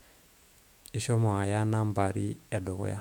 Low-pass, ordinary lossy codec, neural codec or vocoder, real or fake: 19.8 kHz; MP3, 96 kbps; autoencoder, 48 kHz, 128 numbers a frame, DAC-VAE, trained on Japanese speech; fake